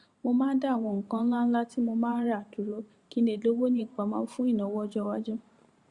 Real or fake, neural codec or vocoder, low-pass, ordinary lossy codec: fake; vocoder, 44.1 kHz, 128 mel bands every 512 samples, BigVGAN v2; 10.8 kHz; Opus, 64 kbps